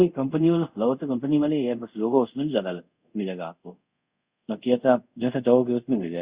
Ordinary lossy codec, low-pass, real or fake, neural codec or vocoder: Opus, 64 kbps; 3.6 kHz; fake; codec, 24 kHz, 0.5 kbps, DualCodec